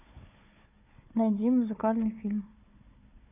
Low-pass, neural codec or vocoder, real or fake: 3.6 kHz; codec, 16 kHz, 4 kbps, FunCodec, trained on Chinese and English, 50 frames a second; fake